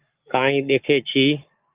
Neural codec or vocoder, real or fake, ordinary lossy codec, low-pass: codec, 44.1 kHz, 7.8 kbps, Pupu-Codec; fake; Opus, 24 kbps; 3.6 kHz